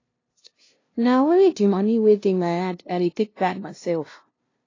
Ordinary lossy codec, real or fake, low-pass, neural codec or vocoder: AAC, 32 kbps; fake; 7.2 kHz; codec, 16 kHz, 0.5 kbps, FunCodec, trained on LibriTTS, 25 frames a second